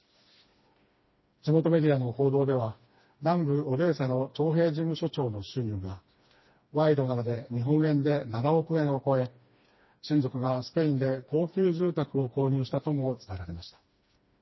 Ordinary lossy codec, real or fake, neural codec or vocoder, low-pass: MP3, 24 kbps; fake; codec, 16 kHz, 2 kbps, FreqCodec, smaller model; 7.2 kHz